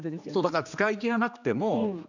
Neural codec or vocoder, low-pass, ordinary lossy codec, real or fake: codec, 16 kHz, 2 kbps, X-Codec, HuBERT features, trained on general audio; 7.2 kHz; none; fake